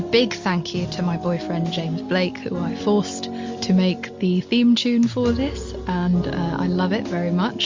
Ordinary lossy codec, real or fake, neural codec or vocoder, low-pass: MP3, 48 kbps; real; none; 7.2 kHz